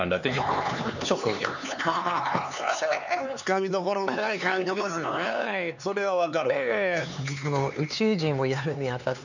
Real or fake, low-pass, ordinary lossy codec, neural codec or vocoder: fake; 7.2 kHz; none; codec, 16 kHz, 4 kbps, X-Codec, HuBERT features, trained on LibriSpeech